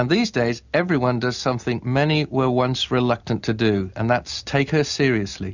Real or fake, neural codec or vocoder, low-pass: real; none; 7.2 kHz